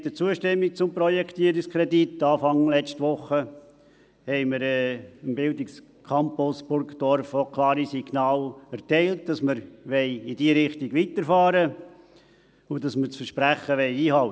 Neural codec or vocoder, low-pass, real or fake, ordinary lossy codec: none; none; real; none